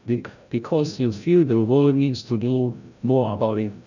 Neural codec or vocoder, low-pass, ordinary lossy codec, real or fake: codec, 16 kHz, 0.5 kbps, FreqCodec, larger model; 7.2 kHz; none; fake